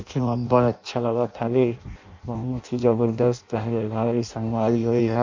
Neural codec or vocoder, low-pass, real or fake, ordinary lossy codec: codec, 16 kHz in and 24 kHz out, 0.6 kbps, FireRedTTS-2 codec; 7.2 kHz; fake; MP3, 48 kbps